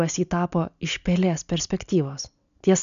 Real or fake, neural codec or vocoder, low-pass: real; none; 7.2 kHz